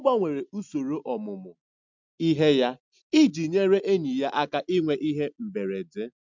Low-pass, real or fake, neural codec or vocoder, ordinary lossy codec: 7.2 kHz; real; none; MP3, 64 kbps